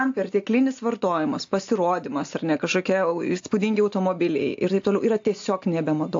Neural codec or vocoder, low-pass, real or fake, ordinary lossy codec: none; 7.2 kHz; real; AAC, 48 kbps